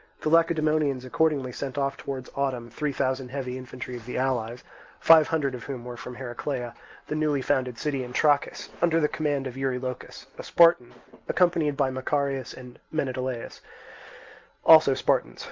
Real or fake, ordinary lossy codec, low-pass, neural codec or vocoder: real; Opus, 24 kbps; 7.2 kHz; none